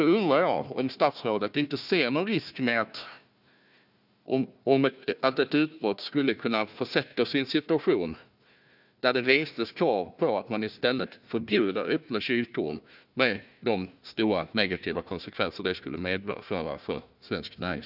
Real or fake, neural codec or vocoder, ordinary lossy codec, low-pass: fake; codec, 16 kHz, 1 kbps, FunCodec, trained on Chinese and English, 50 frames a second; none; 5.4 kHz